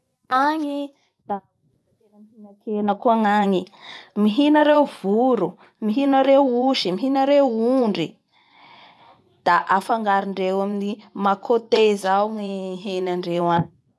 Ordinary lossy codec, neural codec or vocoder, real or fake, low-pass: none; vocoder, 24 kHz, 100 mel bands, Vocos; fake; none